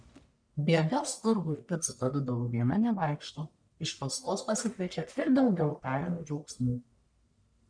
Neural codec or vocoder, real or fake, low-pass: codec, 44.1 kHz, 1.7 kbps, Pupu-Codec; fake; 9.9 kHz